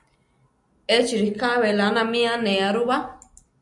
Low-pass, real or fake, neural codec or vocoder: 10.8 kHz; real; none